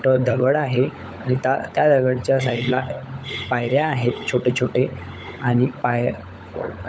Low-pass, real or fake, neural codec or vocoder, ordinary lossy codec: none; fake; codec, 16 kHz, 16 kbps, FunCodec, trained on LibriTTS, 50 frames a second; none